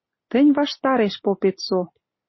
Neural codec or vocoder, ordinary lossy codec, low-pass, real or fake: none; MP3, 24 kbps; 7.2 kHz; real